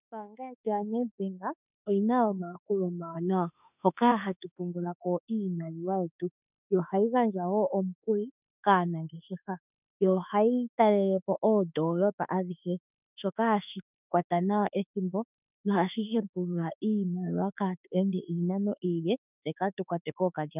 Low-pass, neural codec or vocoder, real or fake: 3.6 kHz; autoencoder, 48 kHz, 32 numbers a frame, DAC-VAE, trained on Japanese speech; fake